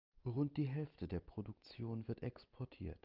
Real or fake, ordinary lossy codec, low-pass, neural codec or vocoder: real; Opus, 24 kbps; 5.4 kHz; none